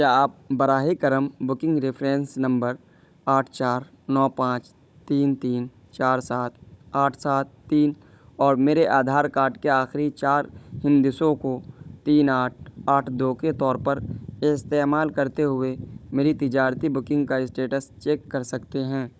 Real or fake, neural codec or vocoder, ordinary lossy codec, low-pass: fake; codec, 16 kHz, 16 kbps, FunCodec, trained on Chinese and English, 50 frames a second; none; none